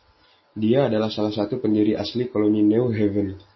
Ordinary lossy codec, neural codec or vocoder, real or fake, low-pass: MP3, 24 kbps; none; real; 7.2 kHz